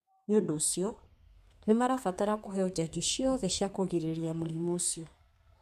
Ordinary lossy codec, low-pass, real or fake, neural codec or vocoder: none; 14.4 kHz; fake; codec, 32 kHz, 1.9 kbps, SNAC